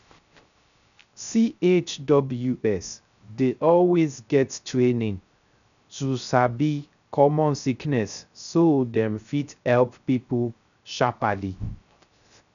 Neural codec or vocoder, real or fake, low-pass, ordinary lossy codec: codec, 16 kHz, 0.3 kbps, FocalCodec; fake; 7.2 kHz; none